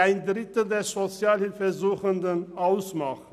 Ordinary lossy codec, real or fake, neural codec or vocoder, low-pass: none; real; none; 14.4 kHz